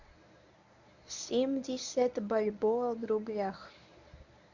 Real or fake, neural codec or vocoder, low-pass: fake; codec, 24 kHz, 0.9 kbps, WavTokenizer, medium speech release version 1; 7.2 kHz